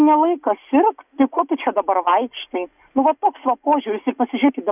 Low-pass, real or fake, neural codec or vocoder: 3.6 kHz; real; none